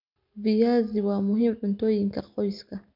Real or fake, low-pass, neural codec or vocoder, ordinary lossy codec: real; 5.4 kHz; none; none